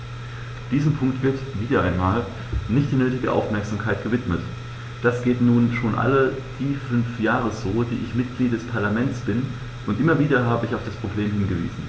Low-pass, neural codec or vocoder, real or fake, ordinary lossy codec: none; none; real; none